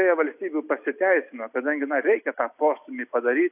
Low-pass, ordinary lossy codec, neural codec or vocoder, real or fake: 3.6 kHz; AAC, 32 kbps; none; real